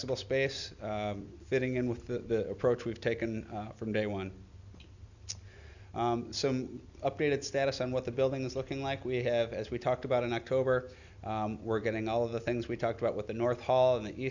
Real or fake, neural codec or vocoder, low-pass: real; none; 7.2 kHz